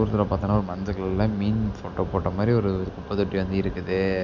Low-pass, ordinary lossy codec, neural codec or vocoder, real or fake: 7.2 kHz; MP3, 64 kbps; none; real